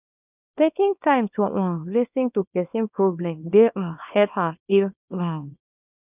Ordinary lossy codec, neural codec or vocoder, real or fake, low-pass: none; codec, 24 kHz, 0.9 kbps, WavTokenizer, small release; fake; 3.6 kHz